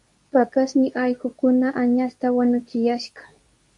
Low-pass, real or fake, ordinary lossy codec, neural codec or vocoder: 10.8 kHz; fake; AAC, 64 kbps; codec, 24 kHz, 0.9 kbps, WavTokenizer, medium speech release version 2